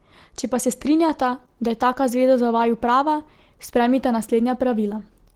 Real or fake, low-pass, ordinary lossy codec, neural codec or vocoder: real; 19.8 kHz; Opus, 16 kbps; none